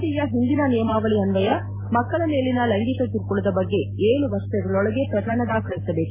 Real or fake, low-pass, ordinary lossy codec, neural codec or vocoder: real; 3.6 kHz; MP3, 16 kbps; none